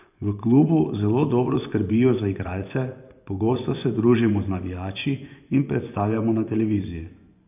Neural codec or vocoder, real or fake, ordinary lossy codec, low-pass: vocoder, 24 kHz, 100 mel bands, Vocos; fake; none; 3.6 kHz